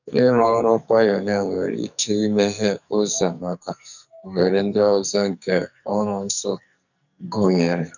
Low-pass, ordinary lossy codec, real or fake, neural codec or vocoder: 7.2 kHz; none; fake; codec, 44.1 kHz, 2.6 kbps, SNAC